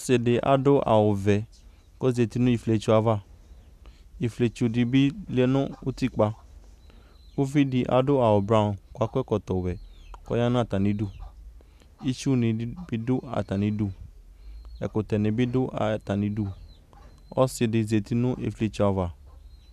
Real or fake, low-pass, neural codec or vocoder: real; 14.4 kHz; none